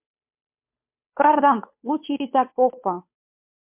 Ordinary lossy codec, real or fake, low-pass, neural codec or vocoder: MP3, 32 kbps; fake; 3.6 kHz; codec, 16 kHz, 2 kbps, FunCodec, trained on Chinese and English, 25 frames a second